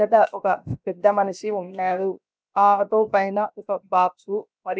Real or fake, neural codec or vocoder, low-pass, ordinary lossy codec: fake; codec, 16 kHz, about 1 kbps, DyCAST, with the encoder's durations; none; none